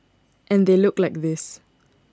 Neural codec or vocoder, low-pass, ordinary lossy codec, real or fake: none; none; none; real